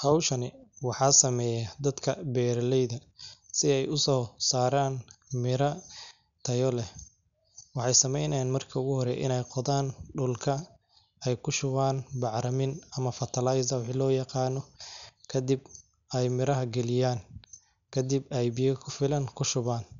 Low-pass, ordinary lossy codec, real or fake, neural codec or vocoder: 7.2 kHz; none; real; none